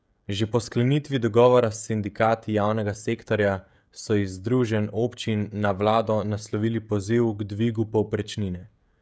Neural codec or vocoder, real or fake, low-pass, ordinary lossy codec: codec, 16 kHz, 16 kbps, FreqCodec, smaller model; fake; none; none